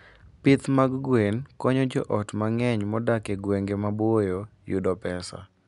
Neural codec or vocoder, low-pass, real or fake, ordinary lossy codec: none; 10.8 kHz; real; none